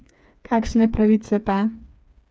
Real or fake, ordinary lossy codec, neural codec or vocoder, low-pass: fake; none; codec, 16 kHz, 4 kbps, FreqCodec, smaller model; none